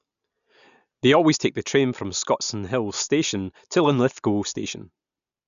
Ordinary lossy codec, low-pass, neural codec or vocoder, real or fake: none; 7.2 kHz; none; real